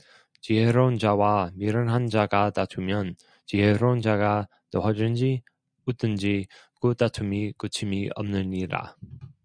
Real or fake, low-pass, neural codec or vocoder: real; 9.9 kHz; none